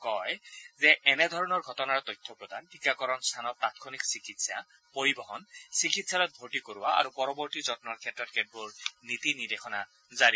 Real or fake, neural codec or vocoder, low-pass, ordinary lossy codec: real; none; none; none